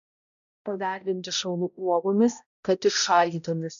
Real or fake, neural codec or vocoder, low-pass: fake; codec, 16 kHz, 0.5 kbps, X-Codec, HuBERT features, trained on balanced general audio; 7.2 kHz